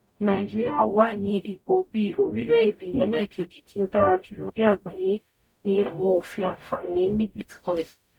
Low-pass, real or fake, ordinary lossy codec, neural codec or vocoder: 19.8 kHz; fake; none; codec, 44.1 kHz, 0.9 kbps, DAC